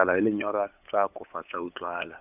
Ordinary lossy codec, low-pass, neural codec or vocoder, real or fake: none; 3.6 kHz; codec, 16 kHz, 8 kbps, FunCodec, trained on LibriTTS, 25 frames a second; fake